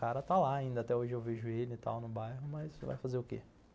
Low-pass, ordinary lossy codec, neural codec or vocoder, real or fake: none; none; none; real